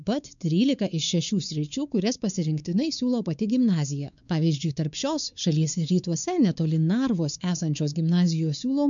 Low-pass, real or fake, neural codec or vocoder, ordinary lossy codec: 7.2 kHz; fake; codec, 16 kHz, 4 kbps, X-Codec, WavLM features, trained on Multilingual LibriSpeech; MP3, 96 kbps